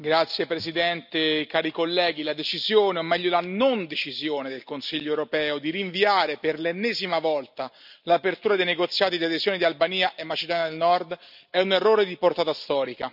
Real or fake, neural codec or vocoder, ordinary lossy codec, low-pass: real; none; none; 5.4 kHz